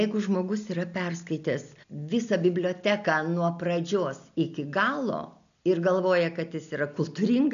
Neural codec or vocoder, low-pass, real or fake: none; 7.2 kHz; real